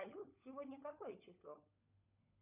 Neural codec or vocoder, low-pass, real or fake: codec, 16 kHz, 16 kbps, FunCodec, trained on LibriTTS, 50 frames a second; 3.6 kHz; fake